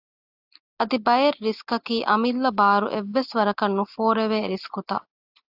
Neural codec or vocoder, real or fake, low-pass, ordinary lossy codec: none; real; 5.4 kHz; AAC, 48 kbps